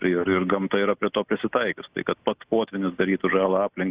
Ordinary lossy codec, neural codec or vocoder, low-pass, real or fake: Opus, 64 kbps; none; 3.6 kHz; real